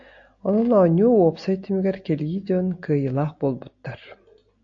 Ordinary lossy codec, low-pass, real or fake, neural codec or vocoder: AAC, 48 kbps; 7.2 kHz; real; none